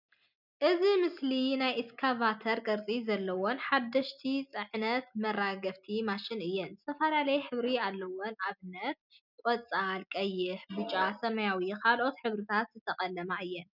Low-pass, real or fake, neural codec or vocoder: 5.4 kHz; real; none